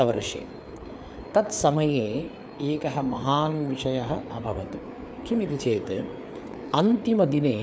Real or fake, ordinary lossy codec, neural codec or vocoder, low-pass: fake; none; codec, 16 kHz, 4 kbps, FreqCodec, larger model; none